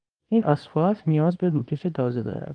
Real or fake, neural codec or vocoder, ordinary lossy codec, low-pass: fake; codec, 24 kHz, 0.9 kbps, WavTokenizer, small release; Opus, 32 kbps; 9.9 kHz